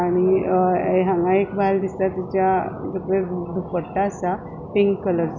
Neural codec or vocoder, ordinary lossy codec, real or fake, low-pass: none; none; real; 7.2 kHz